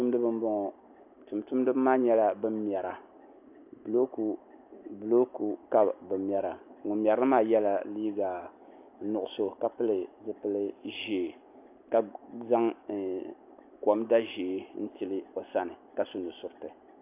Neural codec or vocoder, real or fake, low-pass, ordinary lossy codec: none; real; 3.6 kHz; AAC, 32 kbps